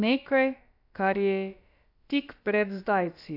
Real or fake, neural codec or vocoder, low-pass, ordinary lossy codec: fake; codec, 16 kHz, about 1 kbps, DyCAST, with the encoder's durations; 5.4 kHz; AAC, 48 kbps